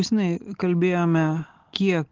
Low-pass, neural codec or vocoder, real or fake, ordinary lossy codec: 7.2 kHz; none; real; Opus, 16 kbps